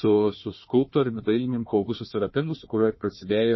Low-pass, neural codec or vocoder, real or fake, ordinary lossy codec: 7.2 kHz; codec, 16 kHz, 1 kbps, FunCodec, trained on Chinese and English, 50 frames a second; fake; MP3, 24 kbps